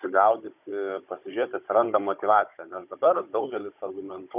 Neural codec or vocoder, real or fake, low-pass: codec, 16 kHz, 16 kbps, FunCodec, trained on Chinese and English, 50 frames a second; fake; 3.6 kHz